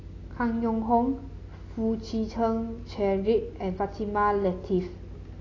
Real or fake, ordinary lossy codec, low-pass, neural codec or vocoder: real; AAC, 32 kbps; 7.2 kHz; none